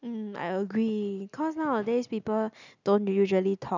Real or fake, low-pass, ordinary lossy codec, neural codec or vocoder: real; 7.2 kHz; none; none